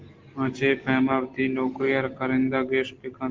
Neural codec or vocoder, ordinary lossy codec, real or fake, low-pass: none; Opus, 16 kbps; real; 7.2 kHz